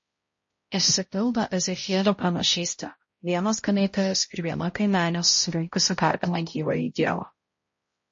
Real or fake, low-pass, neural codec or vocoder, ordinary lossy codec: fake; 7.2 kHz; codec, 16 kHz, 0.5 kbps, X-Codec, HuBERT features, trained on balanced general audio; MP3, 32 kbps